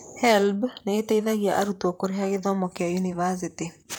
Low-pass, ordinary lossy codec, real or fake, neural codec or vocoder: none; none; fake; vocoder, 44.1 kHz, 128 mel bands, Pupu-Vocoder